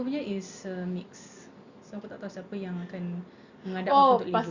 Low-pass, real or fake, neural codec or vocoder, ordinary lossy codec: 7.2 kHz; real; none; Opus, 64 kbps